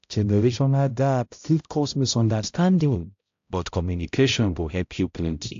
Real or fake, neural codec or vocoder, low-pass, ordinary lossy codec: fake; codec, 16 kHz, 0.5 kbps, X-Codec, HuBERT features, trained on balanced general audio; 7.2 kHz; AAC, 48 kbps